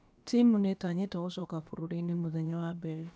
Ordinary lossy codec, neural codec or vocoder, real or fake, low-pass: none; codec, 16 kHz, about 1 kbps, DyCAST, with the encoder's durations; fake; none